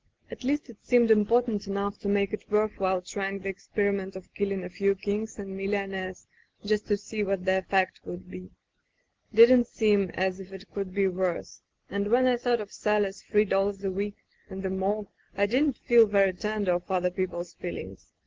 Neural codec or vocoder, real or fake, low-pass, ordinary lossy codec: none; real; 7.2 kHz; Opus, 16 kbps